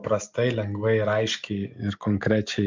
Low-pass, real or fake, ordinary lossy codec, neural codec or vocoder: 7.2 kHz; real; MP3, 64 kbps; none